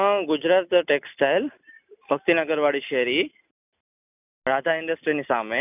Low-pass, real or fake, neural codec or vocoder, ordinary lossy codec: 3.6 kHz; real; none; none